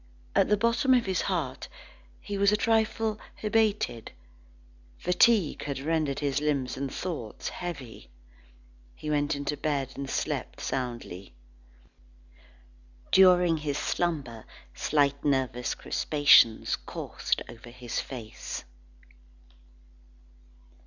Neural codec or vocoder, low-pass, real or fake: none; 7.2 kHz; real